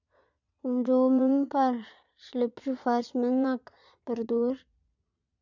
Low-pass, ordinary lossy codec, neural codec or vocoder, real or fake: 7.2 kHz; none; vocoder, 22.05 kHz, 80 mel bands, Vocos; fake